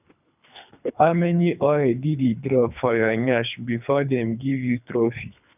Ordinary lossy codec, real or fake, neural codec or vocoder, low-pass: none; fake; codec, 24 kHz, 3 kbps, HILCodec; 3.6 kHz